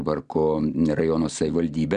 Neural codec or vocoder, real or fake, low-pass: none; real; 9.9 kHz